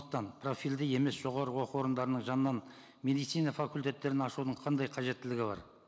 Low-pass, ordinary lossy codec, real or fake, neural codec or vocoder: none; none; real; none